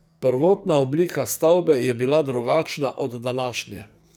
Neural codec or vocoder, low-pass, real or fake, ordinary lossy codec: codec, 44.1 kHz, 2.6 kbps, SNAC; none; fake; none